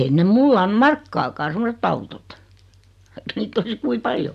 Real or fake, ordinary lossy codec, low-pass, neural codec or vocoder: real; AAC, 96 kbps; 14.4 kHz; none